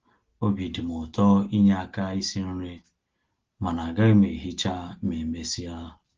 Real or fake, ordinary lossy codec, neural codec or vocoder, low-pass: real; Opus, 16 kbps; none; 7.2 kHz